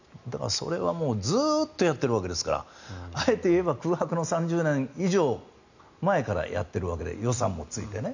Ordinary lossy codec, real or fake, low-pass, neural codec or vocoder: none; real; 7.2 kHz; none